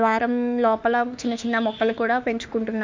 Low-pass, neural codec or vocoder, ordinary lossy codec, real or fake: 7.2 kHz; autoencoder, 48 kHz, 32 numbers a frame, DAC-VAE, trained on Japanese speech; MP3, 64 kbps; fake